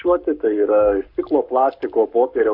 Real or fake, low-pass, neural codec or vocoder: fake; 5.4 kHz; codec, 44.1 kHz, 7.8 kbps, Pupu-Codec